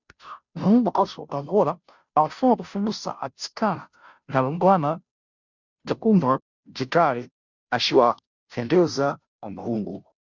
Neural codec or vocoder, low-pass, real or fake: codec, 16 kHz, 0.5 kbps, FunCodec, trained on Chinese and English, 25 frames a second; 7.2 kHz; fake